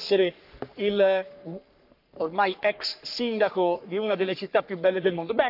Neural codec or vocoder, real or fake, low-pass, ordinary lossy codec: codec, 44.1 kHz, 3.4 kbps, Pupu-Codec; fake; 5.4 kHz; AAC, 48 kbps